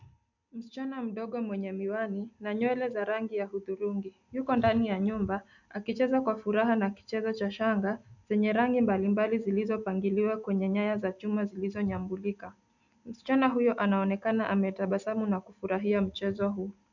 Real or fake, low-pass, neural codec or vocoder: real; 7.2 kHz; none